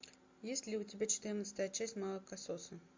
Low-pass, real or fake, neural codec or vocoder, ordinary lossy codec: 7.2 kHz; real; none; MP3, 48 kbps